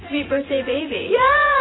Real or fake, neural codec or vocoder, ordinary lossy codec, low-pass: fake; vocoder, 24 kHz, 100 mel bands, Vocos; AAC, 16 kbps; 7.2 kHz